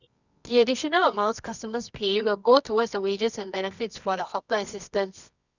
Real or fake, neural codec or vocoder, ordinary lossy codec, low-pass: fake; codec, 24 kHz, 0.9 kbps, WavTokenizer, medium music audio release; none; 7.2 kHz